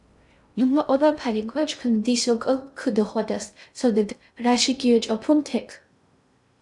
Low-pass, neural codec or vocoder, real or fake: 10.8 kHz; codec, 16 kHz in and 24 kHz out, 0.6 kbps, FocalCodec, streaming, 2048 codes; fake